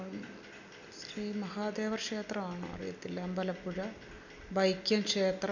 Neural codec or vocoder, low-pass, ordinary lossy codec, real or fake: none; 7.2 kHz; none; real